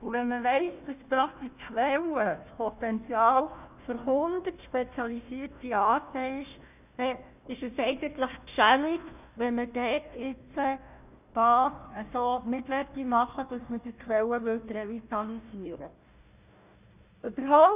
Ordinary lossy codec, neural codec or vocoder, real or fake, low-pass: none; codec, 16 kHz, 1 kbps, FunCodec, trained on Chinese and English, 50 frames a second; fake; 3.6 kHz